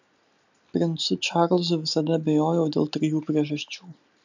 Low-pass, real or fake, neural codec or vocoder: 7.2 kHz; real; none